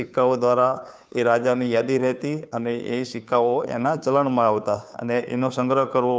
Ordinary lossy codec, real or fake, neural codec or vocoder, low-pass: none; fake; codec, 16 kHz, 2 kbps, FunCodec, trained on Chinese and English, 25 frames a second; none